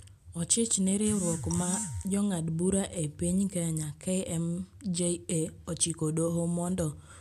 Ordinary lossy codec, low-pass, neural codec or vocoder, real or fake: AAC, 96 kbps; 14.4 kHz; none; real